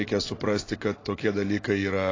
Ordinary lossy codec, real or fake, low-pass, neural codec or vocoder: AAC, 32 kbps; real; 7.2 kHz; none